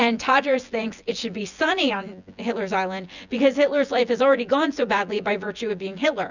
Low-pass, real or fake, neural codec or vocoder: 7.2 kHz; fake; vocoder, 24 kHz, 100 mel bands, Vocos